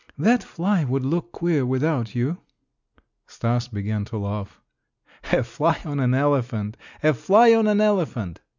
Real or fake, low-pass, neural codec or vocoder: real; 7.2 kHz; none